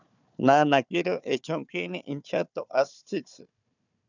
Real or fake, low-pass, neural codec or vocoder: fake; 7.2 kHz; codec, 16 kHz, 4 kbps, FunCodec, trained on Chinese and English, 50 frames a second